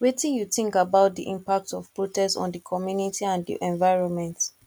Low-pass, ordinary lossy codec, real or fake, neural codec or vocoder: 19.8 kHz; none; real; none